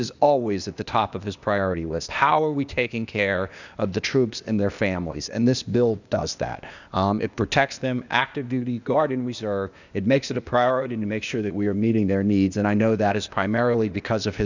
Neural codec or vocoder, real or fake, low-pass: codec, 16 kHz, 0.8 kbps, ZipCodec; fake; 7.2 kHz